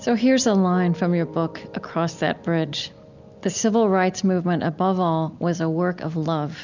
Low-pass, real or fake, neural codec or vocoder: 7.2 kHz; real; none